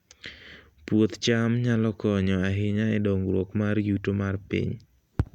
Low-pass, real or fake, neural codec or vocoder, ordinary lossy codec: 19.8 kHz; real; none; none